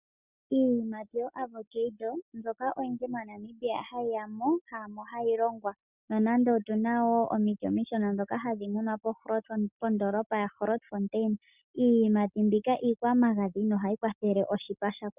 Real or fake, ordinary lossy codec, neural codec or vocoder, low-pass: real; Opus, 64 kbps; none; 3.6 kHz